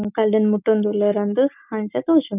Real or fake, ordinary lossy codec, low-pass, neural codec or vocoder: real; none; 3.6 kHz; none